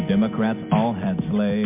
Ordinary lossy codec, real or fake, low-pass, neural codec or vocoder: MP3, 32 kbps; real; 3.6 kHz; none